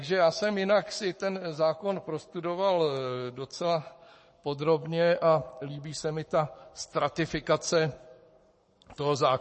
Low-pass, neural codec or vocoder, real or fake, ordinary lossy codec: 10.8 kHz; codec, 44.1 kHz, 7.8 kbps, Pupu-Codec; fake; MP3, 32 kbps